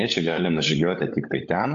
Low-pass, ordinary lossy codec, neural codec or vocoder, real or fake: 7.2 kHz; AAC, 48 kbps; codec, 16 kHz, 16 kbps, FunCodec, trained on LibriTTS, 50 frames a second; fake